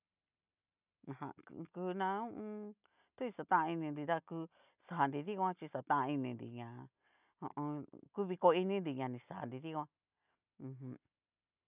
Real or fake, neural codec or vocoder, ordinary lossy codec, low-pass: real; none; none; 3.6 kHz